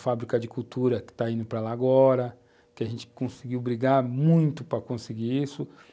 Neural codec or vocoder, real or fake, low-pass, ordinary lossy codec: none; real; none; none